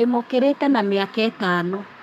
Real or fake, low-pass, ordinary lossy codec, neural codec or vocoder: fake; 14.4 kHz; none; codec, 32 kHz, 1.9 kbps, SNAC